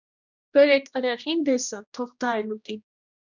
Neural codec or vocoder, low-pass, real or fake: codec, 16 kHz, 1 kbps, X-Codec, HuBERT features, trained on general audio; 7.2 kHz; fake